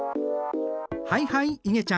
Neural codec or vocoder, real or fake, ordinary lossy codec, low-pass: none; real; none; none